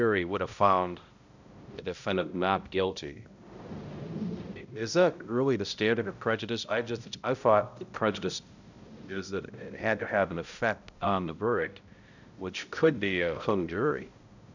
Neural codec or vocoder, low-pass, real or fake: codec, 16 kHz, 0.5 kbps, X-Codec, HuBERT features, trained on balanced general audio; 7.2 kHz; fake